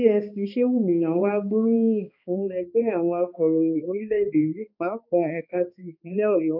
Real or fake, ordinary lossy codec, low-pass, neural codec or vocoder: fake; none; 5.4 kHz; codec, 16 kHz, 2 kbps, X-Codec, HuBERT features, trained on balanced general audio